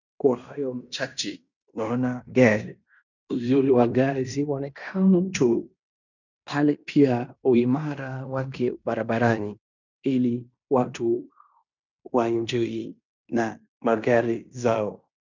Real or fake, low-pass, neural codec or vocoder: fake; 7.2 kHz; codec, 16 kHz in and 24 kHz out, 0.9 kbps, LongCat-Audio-Codec, fine tuned four codebook decoder